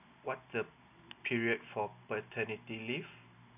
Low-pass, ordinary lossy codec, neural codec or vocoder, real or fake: 3.6 kHz; none; none; real